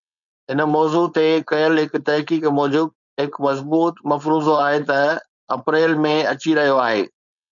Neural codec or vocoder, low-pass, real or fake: codec, 16 kHz, 4.8 kbps, FACodec; 7.2 kHz; fake